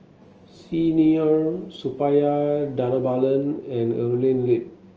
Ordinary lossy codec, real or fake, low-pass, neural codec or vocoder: Opus, 24 kbps; real; 7.2 kHz; none